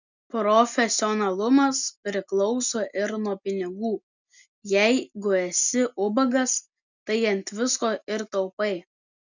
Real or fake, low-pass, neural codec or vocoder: real; 7.2 kHz; none